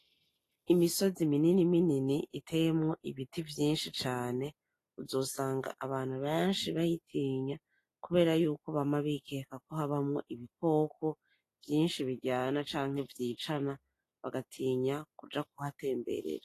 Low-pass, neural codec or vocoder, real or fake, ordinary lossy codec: 14.4 kHz; none; real; AAC, 48 kbps